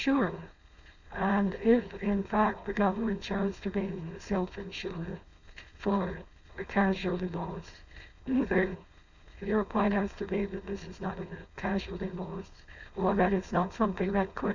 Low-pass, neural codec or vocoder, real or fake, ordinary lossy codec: 7.2 kHz; codec, 16 kHz, 4.8 kbps, FACodec; fake; Opus, 64 kbps